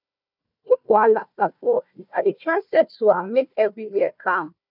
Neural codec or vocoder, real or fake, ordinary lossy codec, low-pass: codec, 16 kHz, 1 kbps, FunCodec, trained on Chinese and English, 50 frames a second; fake; none; 5.4 kHz